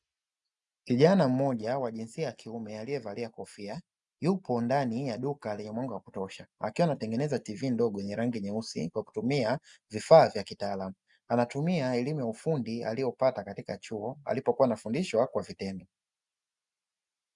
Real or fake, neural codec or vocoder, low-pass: real; none; 10.8 kHz